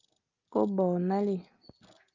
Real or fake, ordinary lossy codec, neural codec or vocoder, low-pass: real; Opus, 24 kbps; none; 7.2 kHz